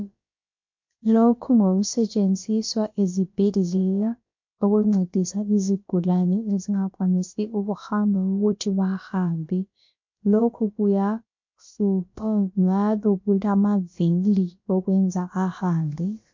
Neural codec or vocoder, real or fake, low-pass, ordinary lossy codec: codec, 16 kHz, about 1 kbps, DyCAST, with the encoder's durations; fake; 7.2 kHz; MP3, 48 kbps